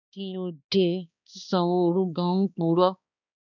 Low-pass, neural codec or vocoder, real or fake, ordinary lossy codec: 7.2 kHz; codec, 16 kHz, 2 kbps, X-Codec, HuBERT features, trained on balanced general audio; fake; none